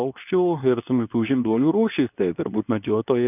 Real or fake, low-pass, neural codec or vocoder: fake; 3.6 kHz; codec, 24 kHz, 0.9 kbps, WavTokenizer, medium speech release version 2